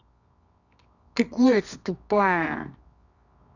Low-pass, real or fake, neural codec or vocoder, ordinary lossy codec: 7.2 kHz; fake; codec, 24 kHz, 0.9 kbps, WavTokenizer, medium music audio release; none